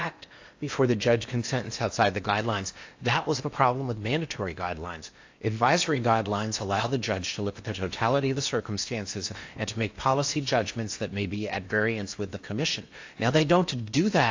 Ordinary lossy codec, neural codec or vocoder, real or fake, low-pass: AAC, 48 kbps; codec, 16 kHz in and 24 kHz out, 0.8 kbps, FocalCodec, streaming, 65536 codes; fake; 7.2 kHz